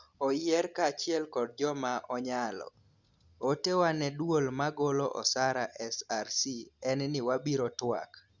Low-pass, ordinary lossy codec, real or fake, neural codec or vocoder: 7.2 kHz; Opus, 64 kbps; real; none